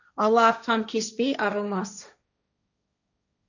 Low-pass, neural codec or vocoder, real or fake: 7.2 kHz; codec, 16 kHz, 1.1 kbps, Voila-Tokenizer; fake